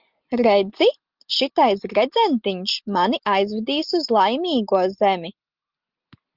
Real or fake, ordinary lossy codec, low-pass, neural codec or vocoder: real; Opus, 32 kbps; 5.4 kHz; none